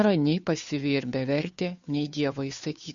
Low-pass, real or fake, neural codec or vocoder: 7.2 kHz; fake; codec, 16 kHz, 2 kbps, FunCodec, trained on Chinese and English, 25 frames a second